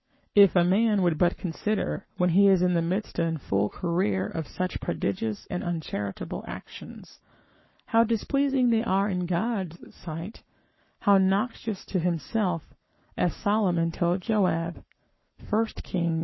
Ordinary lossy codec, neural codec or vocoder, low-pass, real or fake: MP3, 24 kbps; codec, 44.1 kHz, 7.8 kbps, Pupu-Codec; 7.2 kHz; fake